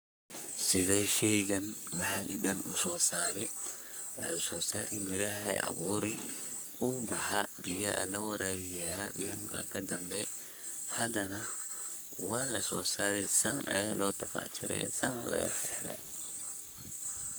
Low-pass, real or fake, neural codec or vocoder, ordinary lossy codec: none; fake; codec, 44.1 kHz, 3.4 kbps, Pupu-Codec; none